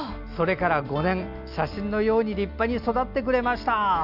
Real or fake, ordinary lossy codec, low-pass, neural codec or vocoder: real; none; 5.4 kHz; none